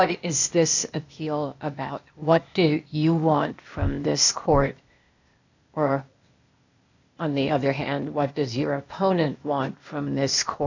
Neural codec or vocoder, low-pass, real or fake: codec, 16 kHz, 0.8 kbps, ZipCodec; 7.2 kHz; fake